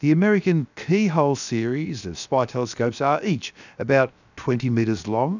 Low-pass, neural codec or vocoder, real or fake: 7.2 kHz; codec, 16 kHz, about 1 kbps, DyCAST, with the encoder's durations; fake